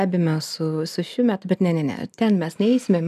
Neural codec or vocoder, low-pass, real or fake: none; 14.4 kHz; real